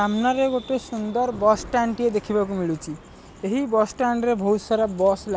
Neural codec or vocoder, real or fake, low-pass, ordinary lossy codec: none; real; none; none